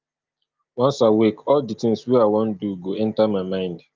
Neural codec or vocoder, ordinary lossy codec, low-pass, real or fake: none; Opus, 32 kbps; 7.2 kHz; real